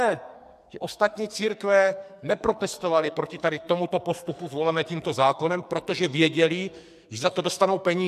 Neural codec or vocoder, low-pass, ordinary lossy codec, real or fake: codec, 44.1 kHz, 2.6 kbps, SNAC; 14.4 kHz; MP3, 96 kbps; fake